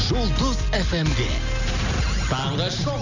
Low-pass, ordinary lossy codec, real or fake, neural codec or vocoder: 7.2 kHz; none; real; none